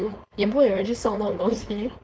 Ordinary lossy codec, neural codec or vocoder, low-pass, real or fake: none; codec, 16 kHz, 4.8 kbps, FACodec; none; fake